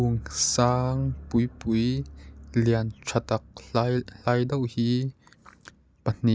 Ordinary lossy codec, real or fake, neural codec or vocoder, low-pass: none; real; none; none